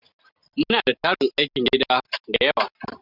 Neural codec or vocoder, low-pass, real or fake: none; 5.4 kHz; real